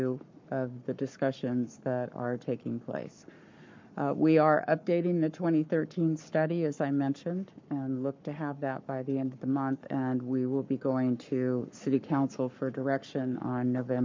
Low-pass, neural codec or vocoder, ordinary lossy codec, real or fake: 7.2 kHz; codec, 44.1 kHz, 7.8 kbps, Pupu-Codec; MP3, 64 kbps; fake